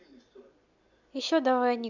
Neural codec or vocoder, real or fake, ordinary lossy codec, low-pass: none; real; none; 7.2 kHz